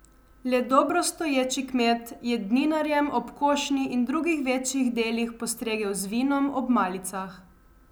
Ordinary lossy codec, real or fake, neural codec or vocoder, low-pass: none; real; none; none